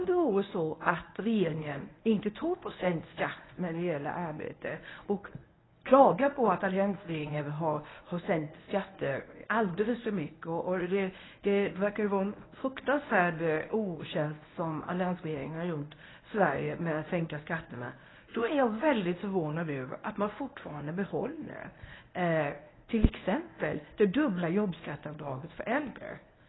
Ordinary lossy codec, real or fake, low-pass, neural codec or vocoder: AAC, 16 kbps; fake; 7.2 kHz; codec, 24 kHz, 0.9 kbps, WavTokenizer, medium speech release version 1